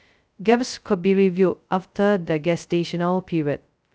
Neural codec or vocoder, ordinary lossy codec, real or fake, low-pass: codec, 16 kHz, 0.2 kbps, FocalCodec; none; fake; none